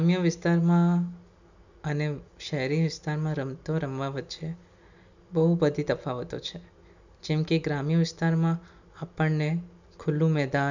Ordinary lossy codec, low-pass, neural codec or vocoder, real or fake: none; 7.2 kHz; none; real